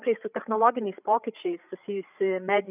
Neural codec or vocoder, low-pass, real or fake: codec, 16 kHz, 8 kbps, FreqCodec, larger model; 3.6 kHz; fake